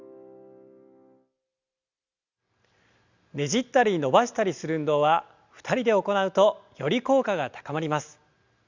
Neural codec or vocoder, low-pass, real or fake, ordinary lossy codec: none; 7.2 kHz; real; Opus, 64 kbps